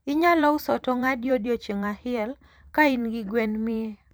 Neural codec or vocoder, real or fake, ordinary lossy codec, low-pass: vocoder, 44.1 kHz, 128 mel bands every 512 samples, BigVGAN v2; fake; none; none